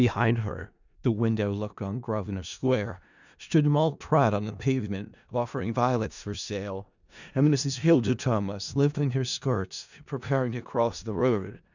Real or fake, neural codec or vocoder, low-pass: fake; codec, 16 kHz in and 24 kHz out, 0.4 kbps, LongCat-Audio-Codec, four codebook decoder; 7.2 kHz